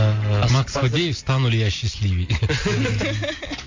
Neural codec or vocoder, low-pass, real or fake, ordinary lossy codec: none; 7.2 kHz; real; MP3, 48 kbps